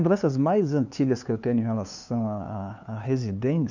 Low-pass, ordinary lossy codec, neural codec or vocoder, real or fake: 7.2 kHz; none; codec, 16 kHz, 2 kbps, FunCodec, trained on LibriTTS, 25 frames a second; fake